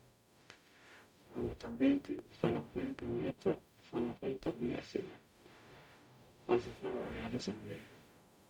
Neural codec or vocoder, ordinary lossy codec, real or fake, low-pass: codec, 44.1 kHz, 0.9 kbps, DAC; none; fake; 19.8 kHz